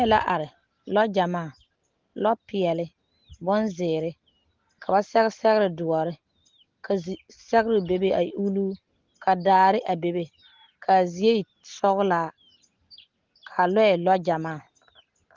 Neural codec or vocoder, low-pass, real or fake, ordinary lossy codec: none; 7.2 kHz; real; Opus, 16 kbps